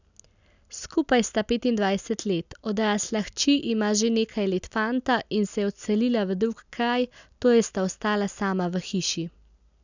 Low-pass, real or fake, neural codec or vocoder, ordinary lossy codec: 7.2 kHz; real; none; none